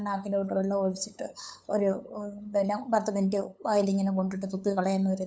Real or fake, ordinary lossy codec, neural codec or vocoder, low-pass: fake; none; codec, 16 kHz, 8 kbps, FunCodec, trained on LibriTTS, 25 frames a second; none